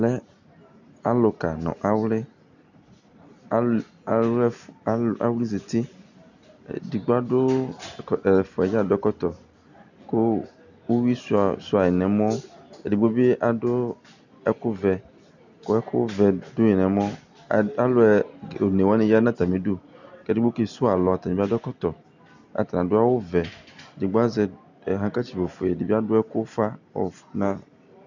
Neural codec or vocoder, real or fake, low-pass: none; real; 7.2 kHz